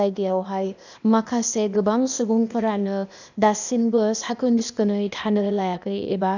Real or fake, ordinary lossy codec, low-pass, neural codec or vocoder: fake; none; 7.2 kHz; codec, 16 kHz, 0.8 kbps, ZipCodec